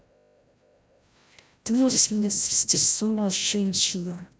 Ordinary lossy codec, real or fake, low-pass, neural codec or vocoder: none; fake; none; codec, 16 kHz, 0.5 kbps, FreqCodec, larger model